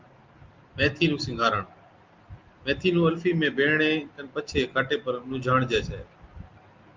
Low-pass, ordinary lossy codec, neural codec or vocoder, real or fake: 7.2 kHz; Opus, 32 kbps; none; real